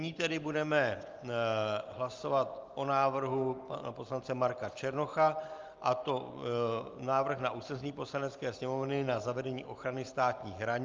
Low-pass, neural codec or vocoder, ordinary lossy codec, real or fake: 7.2 kHz; none; Opus, 32 kbps; real